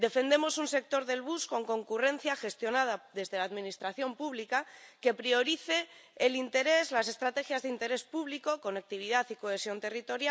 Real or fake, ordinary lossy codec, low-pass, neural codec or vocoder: real; none; none; none